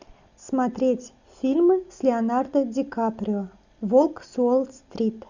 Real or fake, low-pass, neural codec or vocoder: real; 7.2 kHz; none